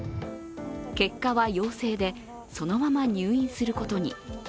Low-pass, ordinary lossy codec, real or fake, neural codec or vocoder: none; none; real; none